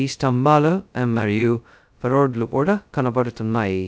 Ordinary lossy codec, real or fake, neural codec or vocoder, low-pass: none; fake; codec, 16 kHz, 0.2 kbps, FocalCodec; none